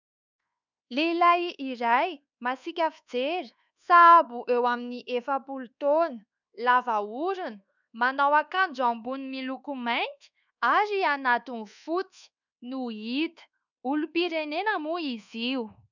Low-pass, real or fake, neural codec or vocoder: 7.2 kHz; fake; codec, 24 kHz, 1.2 kbps, DualCodec